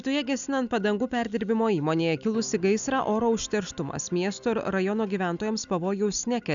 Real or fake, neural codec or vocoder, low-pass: real; none; 7.2 kHz